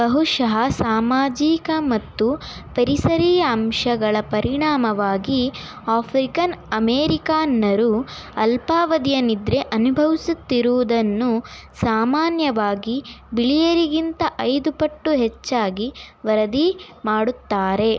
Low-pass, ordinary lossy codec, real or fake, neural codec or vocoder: none; none; real; none